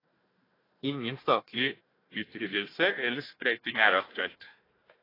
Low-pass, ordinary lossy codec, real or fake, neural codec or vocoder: 5.4 kHz; AAC, 24 kbps; fake; codec, 16 kHz, 1 kbps, FunCodec, trained on Chinese and English, 50 frames a second